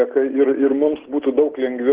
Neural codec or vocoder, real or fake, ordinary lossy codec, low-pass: none; real; Opus, 16 kbps; 3.6 kHz